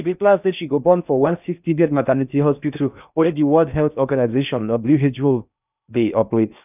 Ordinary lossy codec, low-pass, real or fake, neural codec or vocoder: none; 3.6 kHz; fake; codec, 16 kHz in and 24 kHz out, 0.6 kbps, FocalCodec, streaming, 2048 codes